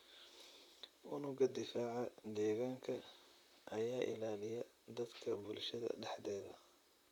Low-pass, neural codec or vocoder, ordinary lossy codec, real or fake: 19.8 kHz; vocoder, 44.1 kHz, 128 mel bands, Pupu-Vocoder; none; fake